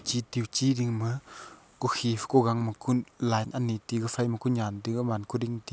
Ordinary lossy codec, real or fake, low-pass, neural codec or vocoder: none; real; none; none